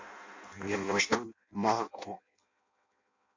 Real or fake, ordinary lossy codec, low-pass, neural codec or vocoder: fake; MP3, 48 kbps; 7.2 kHz; codec, 16 kHz in and 24 kHz out, 0.6 kbps, FireRedTTS-2 codec